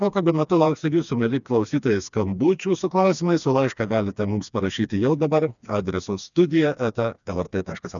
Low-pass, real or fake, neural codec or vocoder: 7.2 kHz; fake; codec, 16 kHz, 2 kbps, FreqCodec, smaller model